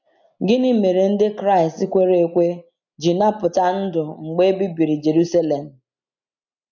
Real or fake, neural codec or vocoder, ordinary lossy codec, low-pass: real; none; MP3, 64 kbps; 7.2 kHz